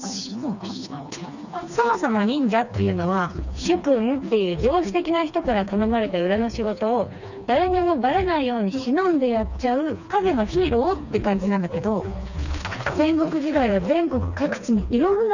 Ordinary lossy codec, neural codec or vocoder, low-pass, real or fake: none; codec, 16 kHz, 2 kbps, FreqCodec, smaller model; 7.2 kHz; fake